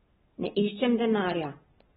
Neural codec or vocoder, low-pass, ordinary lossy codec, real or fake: none; 7.2 kHz; AAC, 16 kbps; real